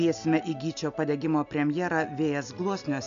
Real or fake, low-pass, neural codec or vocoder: real; 7.2 kHz; none